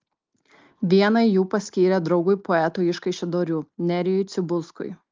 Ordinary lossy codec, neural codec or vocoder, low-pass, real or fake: Opus, 32 kbps; none; 7.2 kHz; real